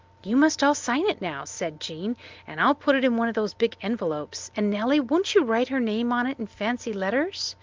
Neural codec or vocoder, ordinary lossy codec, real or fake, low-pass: none; Opus, 32 kbps; real; 7.2 kHz